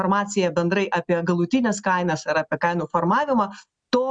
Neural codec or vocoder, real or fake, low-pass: none; real; 9.9 kHz